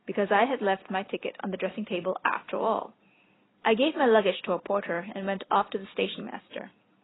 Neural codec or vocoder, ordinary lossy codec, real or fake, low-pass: none; AAC, 16 kbps; real; 7.2 kHz